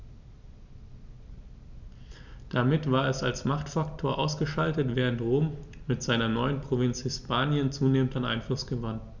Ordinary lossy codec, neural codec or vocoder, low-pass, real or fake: none; none; 7.2 kHz; real